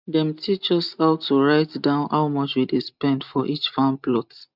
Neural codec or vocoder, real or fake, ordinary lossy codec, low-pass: none; real; none; 5.4 kHz